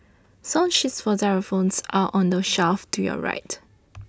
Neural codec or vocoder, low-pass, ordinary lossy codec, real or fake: none; none; none; real